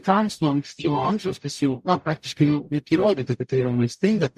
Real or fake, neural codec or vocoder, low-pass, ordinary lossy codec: fake; codec, 44.1 kHz, 0.9 kbps, DAC; 14.4 kHz; MP3, 64 kbps